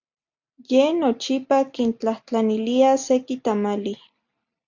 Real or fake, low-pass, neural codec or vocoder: real; 7.2 kHz; none